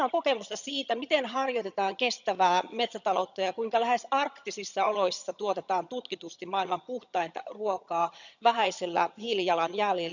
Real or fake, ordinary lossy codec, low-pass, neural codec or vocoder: fake; none; 7.2 kHz; vocoder, 22.05 kHz, 80 mel bands, HiFi-GAN